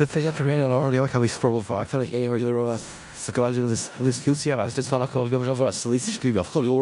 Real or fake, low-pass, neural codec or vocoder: fake; 10.8 kHz; codec, 16 kHz in and 24 kHz out, 0.4 kbps, LongCat-Audio-Codec, four codebook decoder